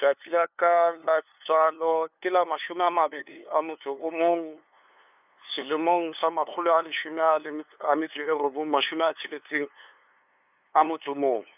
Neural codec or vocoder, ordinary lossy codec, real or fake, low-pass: codec, 16 kHz, 2 kbps, FunCodec, trained on LibriTTS, 25 frames a second; none; fake; 3.6 kHz